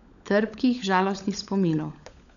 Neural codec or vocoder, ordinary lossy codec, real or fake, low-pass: codec, 16 kHz, 16 kbps, FunCodec, trained on LibriTTS, 50 frames a second; none; fake; 7.2 kHz